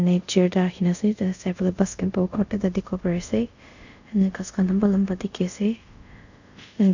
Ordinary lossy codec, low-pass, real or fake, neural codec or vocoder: none; 7.2 kHz; fake; codec, 24 kHz, 0.5 kbps, DualCodec